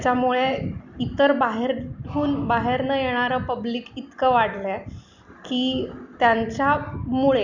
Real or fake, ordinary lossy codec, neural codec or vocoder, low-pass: real; none; none; 7.2 kHz